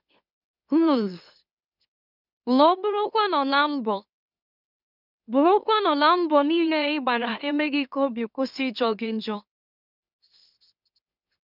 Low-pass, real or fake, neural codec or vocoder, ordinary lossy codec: 5.4 kHz; fake; autoencoder, 44.1 kHz, a latent of 192 numbers a frame, MeloTTS; none